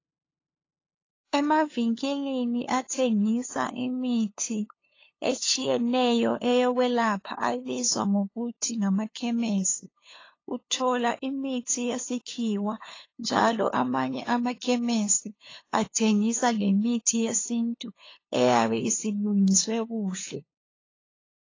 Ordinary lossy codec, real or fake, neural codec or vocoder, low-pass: AAC, 32 kbps; fake; codec, 16 kHz, 2 kbps, FunCodec, trained on LibriTTS, 25 frames a second; 7.2 kHz